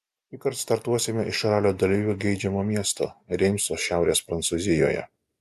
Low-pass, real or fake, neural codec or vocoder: 14.4 kHz; real; none